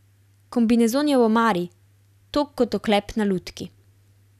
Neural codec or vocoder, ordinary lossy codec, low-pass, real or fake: none; none; 14.4 kHz; real